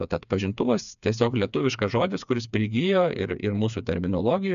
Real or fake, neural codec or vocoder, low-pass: fake; codec, 16 kHz, 4 kbps, FreqCodec, smaller model; 7.2 kHz